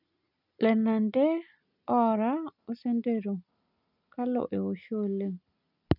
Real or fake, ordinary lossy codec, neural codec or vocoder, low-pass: real; none; none; 5.4 kHz